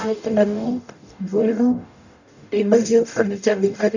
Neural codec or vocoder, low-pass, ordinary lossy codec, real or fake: codec, 44.1 kHz, 0.9 kbps, DAC; 7.2 kHz; none; fake